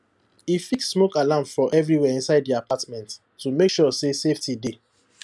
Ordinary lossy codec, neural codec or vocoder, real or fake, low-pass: none; none; real; none